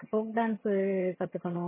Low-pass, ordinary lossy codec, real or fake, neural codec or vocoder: 3.6 kHz; MP3, 16 kbps; fake; vocoder, 22.05 kHz, 80 mel bands, HiFi-GAN